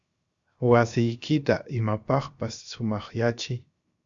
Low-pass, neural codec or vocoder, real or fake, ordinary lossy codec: 7.2 kHz; codec, 16 kHz, 0.7 kbps, FocalCodec; fake; Opus, 64 kbps